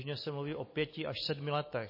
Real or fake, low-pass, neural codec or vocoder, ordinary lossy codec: real; 5.4 kHz; none; MP3, 24 kbps